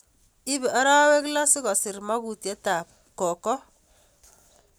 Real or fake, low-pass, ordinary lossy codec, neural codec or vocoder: real; none; none; none